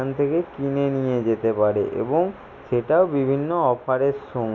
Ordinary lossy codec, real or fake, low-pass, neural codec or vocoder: none; real; 7.2 kHz; none